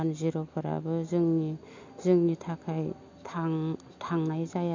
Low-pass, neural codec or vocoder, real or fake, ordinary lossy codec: 7.2 kHz; none; real; MP3, 48 kbps